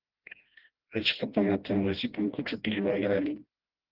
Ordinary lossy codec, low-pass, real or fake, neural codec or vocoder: Opus, 16 kbps; 5.4 kHz; fake; codec, 16 kHz, 1 kbps, FreqCodec, smaller model